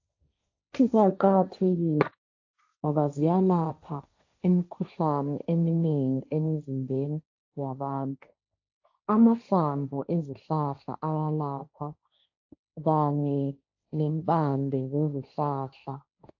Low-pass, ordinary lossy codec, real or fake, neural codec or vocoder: 7.2 kHz; AAC, 48 kbps; fake; codec, 16 kHz, 1.1 kbps, Voila-Tokenizer